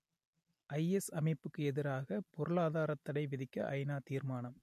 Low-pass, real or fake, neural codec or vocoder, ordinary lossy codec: 14.4 kHz; real; none; MP3, 64 kbps